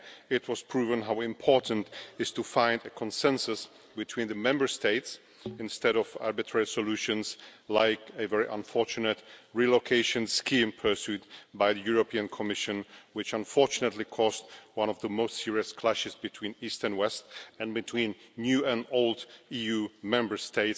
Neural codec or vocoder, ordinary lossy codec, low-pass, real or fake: none; none; none; real